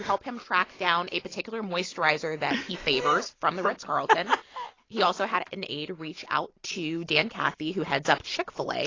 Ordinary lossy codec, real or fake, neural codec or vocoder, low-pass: AAC, 32 kbps; real; none; 7.2 kHz